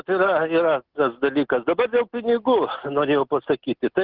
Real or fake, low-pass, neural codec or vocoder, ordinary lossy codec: real; 5.4 kHz; none; Opus, 16 kbps